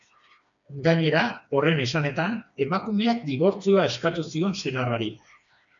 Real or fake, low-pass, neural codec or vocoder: fake; 7.2 kHz; codec, 16 kHz, 2 kbps, FreqCodec, smaller model